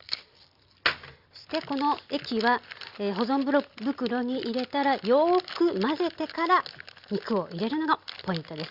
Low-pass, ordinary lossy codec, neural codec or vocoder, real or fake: 5.4 kHz; Opus, 64 kbps; none; real